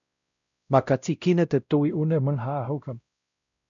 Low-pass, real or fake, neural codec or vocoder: 7.2 kHz; fake; codec, 16 kHz, 0.5 kbps, X-Codec, WavLM features, trained on Multilingual LibriSpeech